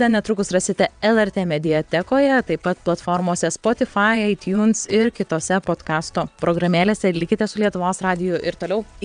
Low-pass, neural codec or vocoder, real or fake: 9.9 kHz; vocoder, 22.05 kHz, 80 mel bands, WaveNeXt; fake